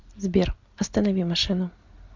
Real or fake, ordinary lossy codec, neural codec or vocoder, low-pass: real; MP3, 64 kbps; none; 7.2 kHz